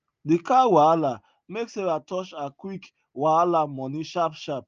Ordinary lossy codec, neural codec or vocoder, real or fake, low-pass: Opus, 24 kbps; none; real; 7.2 kHz